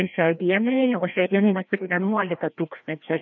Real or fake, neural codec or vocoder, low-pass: fake; codec, 16 kHz, 1 kbps, FreqCodec, larger model; 7.2 kHz